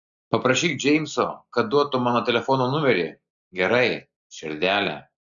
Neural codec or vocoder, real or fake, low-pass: none; real; 7.2 kHz